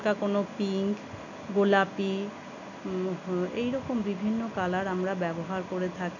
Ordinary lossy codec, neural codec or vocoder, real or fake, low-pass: none; none; real; 7.2 kHz